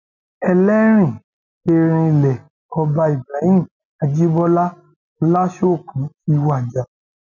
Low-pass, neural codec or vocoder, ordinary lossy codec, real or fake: 7.2 kHz; none; none; real